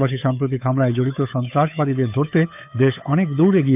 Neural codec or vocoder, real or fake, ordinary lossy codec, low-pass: codec, 16 kHz, 8 kbps, FunCodec, trained on Chinese and English, 25 frames a second; fake; none; 3.6 kHz